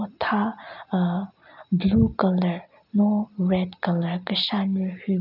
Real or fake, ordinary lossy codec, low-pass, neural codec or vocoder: real; none; 5.4 kHz; none